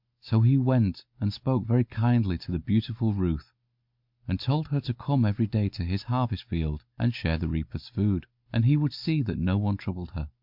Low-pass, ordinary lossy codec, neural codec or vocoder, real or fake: 5.4 kHz; MP3, 48 kbps; none; real